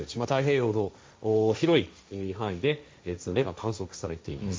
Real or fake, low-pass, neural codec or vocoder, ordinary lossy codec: fake; none; codec, 16 kHz, 1.1 kbps, Voila-Tokenizer; none